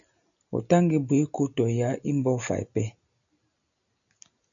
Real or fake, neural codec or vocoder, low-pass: real; none; 7.2 kHz